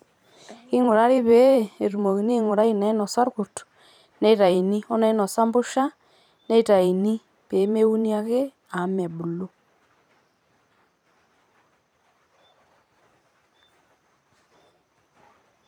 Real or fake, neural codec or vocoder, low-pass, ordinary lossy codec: fake; vocoder, 44.1 kHz, 128 mel bands every 512 samples, BigVGAN v2; 19.8 kHz; none